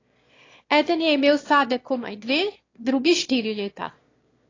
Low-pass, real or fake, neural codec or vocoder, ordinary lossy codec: 7.2 kHz; fake; autoencoder, 22.05 kHz, a latent of 192 numbers a frame, VITS, trained on one speaker; AAC, 32 kbps